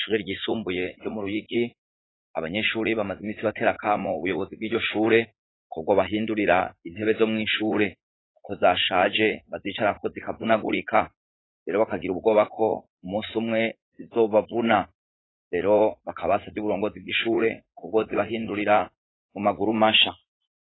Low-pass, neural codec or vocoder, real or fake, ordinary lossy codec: 7.2 kHz; vocoder, 44.1 kHz, 80 mel bands, Vocos; fake; AAC, 16 kbps